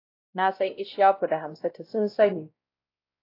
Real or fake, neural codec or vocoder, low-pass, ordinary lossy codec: fake; codec, 16 kHz, 0.5 kbps, X-Codec, WavLM features, trained on Multilingual LibriSpeech; 5.4 kHz; AAC, 32 kbps